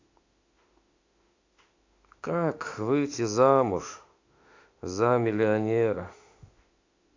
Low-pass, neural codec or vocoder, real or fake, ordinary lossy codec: 7.2 kHz; autoencoder, 48 kHz, 32 numbers a frame, DAC-VAE, trained on Japanese speech; fake; AAC, 48 kbps